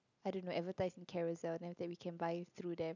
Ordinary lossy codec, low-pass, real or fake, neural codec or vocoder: none; 7.2 kHz; real; none